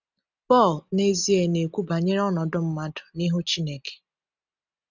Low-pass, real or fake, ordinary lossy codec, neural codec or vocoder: 7.2 kHz; real; none; none